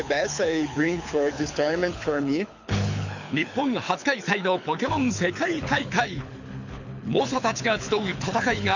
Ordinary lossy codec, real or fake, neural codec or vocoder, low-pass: none; fake; codec, 24 kHz, 6 kbps, HILCodec; 7.2 kHz